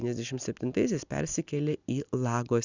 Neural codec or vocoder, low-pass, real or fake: none; 7.2 kHz; real